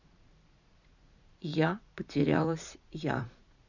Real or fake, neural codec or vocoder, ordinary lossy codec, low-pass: fake; vocoder, 44.1 kHz, 80 mel bands, Vocos; none; 7.2 kHz